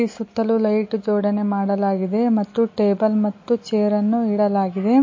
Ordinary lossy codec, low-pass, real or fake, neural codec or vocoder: MP3, 32 kbps; 7.2 kHz; real; none